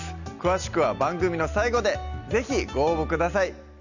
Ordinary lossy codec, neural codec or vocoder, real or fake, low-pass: none; none; real; 7.2 kHz